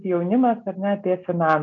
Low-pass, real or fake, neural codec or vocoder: 7.2 kHz; real; none